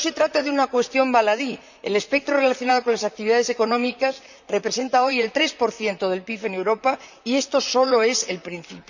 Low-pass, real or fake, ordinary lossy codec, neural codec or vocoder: 7.2 kHz; fake; none; vocoder, 44.1 kHz, 128 mel bands, Pupu-Vocoder